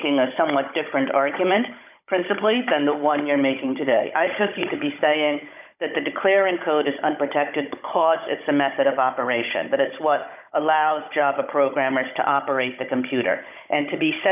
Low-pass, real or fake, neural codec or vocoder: 3.6 kHz; fake; codec, 16 kHz, 16 kbps, FunCodec, trained on Chinese and English, 50 frames a second